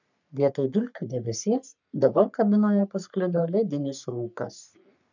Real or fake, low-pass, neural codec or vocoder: fake; 7.2 kHz; codec, 44.1 kHz, 3.4 kbps, Pupu-Codec